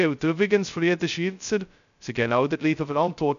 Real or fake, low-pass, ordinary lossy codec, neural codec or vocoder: fake; 7.2 kHz; none; codec, 16 kHz, 0.2 kbps, FocalCodec